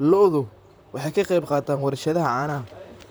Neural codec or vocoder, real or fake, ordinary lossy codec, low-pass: vocoder, 44.1 kHz, 128 mel bands, Pupu-Vocoder; fake; none; none